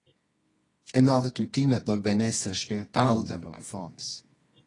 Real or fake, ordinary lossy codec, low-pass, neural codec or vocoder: fake; AAC, 32 kbps; 10.8 kHz; codec, 24 kHz, 0.9 kbps, WavTokenizer, medium music audio release